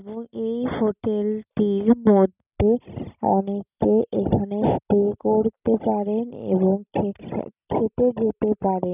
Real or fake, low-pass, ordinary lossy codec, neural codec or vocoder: real; 3.6 kHz; none; none